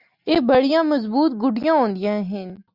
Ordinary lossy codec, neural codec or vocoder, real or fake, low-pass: Opus, 64 kbps; none; real; 5.4 kHz